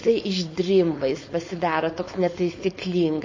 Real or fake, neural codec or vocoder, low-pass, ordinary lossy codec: fake; codec, 16 kHz, 4.8 kbps, FACodec; 7.2 kHz; MP3, 32 kbps